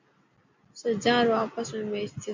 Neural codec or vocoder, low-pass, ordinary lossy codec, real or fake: none; 7.2 kHz; MP3, 48 kbps; real